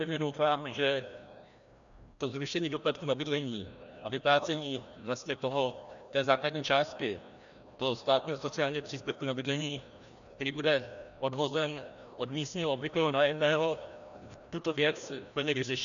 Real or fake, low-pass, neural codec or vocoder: fake; 7.2 kHz; codec, 16 kHz, 1 kbps, FreqCodec, larger model